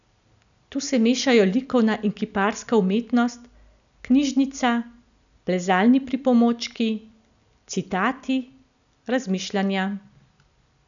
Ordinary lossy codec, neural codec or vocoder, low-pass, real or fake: none; none; 7.2 kHz; real